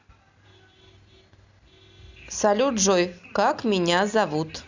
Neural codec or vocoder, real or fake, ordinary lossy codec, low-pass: none; real; Opus, 64 kbps; 7.2 kHz